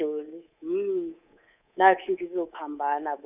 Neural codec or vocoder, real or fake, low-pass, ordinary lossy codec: codec, 24 kHz, 3.1 kbps, DualCodec; fake; 3.6 kHz; none